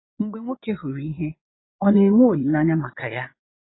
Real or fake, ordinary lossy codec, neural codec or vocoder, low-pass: fake; AAC, 16 kbps; vocoder, 22.05 kHz, 80 mel bands, Vocos; 7.2 kHz